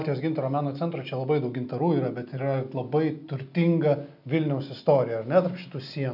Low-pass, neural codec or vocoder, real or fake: 5.4 kHz; none; real